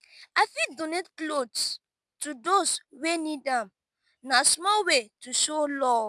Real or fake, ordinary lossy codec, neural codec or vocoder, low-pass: real; none; none; none